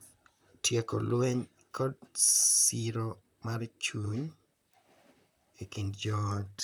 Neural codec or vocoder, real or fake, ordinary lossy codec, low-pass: vocoder, 44.1 kHz, 128 mel bands, Pupu-Vocoder; fake; none; none